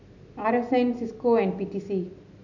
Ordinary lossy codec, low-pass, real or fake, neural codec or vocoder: none; 7.2 kHz; real; none